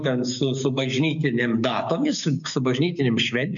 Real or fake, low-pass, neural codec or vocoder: fake; 7.2 kHz; codec, 16 kHz, 6 kbps, DAC